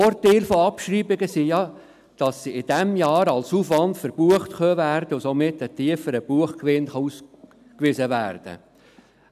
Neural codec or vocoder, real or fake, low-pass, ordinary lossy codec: none; real; 14.4 kHz; none